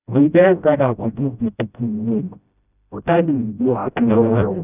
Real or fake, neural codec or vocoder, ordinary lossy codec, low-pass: fake; codec, 16 kHz, 0.5 kbps, FreqCodec, smaller model; none; 3.6 kHz